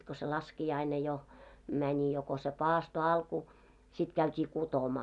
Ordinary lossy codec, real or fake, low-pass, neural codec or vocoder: none; real; 10.8 kHz; none